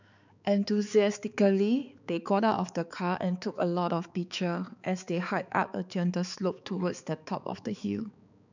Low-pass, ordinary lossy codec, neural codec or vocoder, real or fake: 7.2 kHz; none; codec, 16 kHz, 4 kbps, X-Codec, HuBERT features, trained on balanced general audio; fake